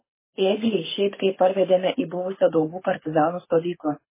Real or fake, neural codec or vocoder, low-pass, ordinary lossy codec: fake; codec, 24 kHz, 6 kbps, HILCodec; 3.6 kHz; MP3, 16 kbps